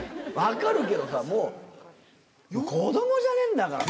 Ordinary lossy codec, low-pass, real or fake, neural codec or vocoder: none; none; real; none